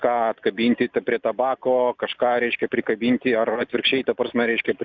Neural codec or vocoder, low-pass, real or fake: none; 7.2 kHz; real